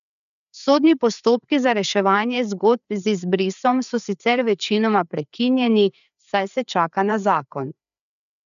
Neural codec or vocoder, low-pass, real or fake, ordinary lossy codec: codec, 16 kHz, 4 kbps, FreqCodec, larger model; 7.2 kHz; fake; none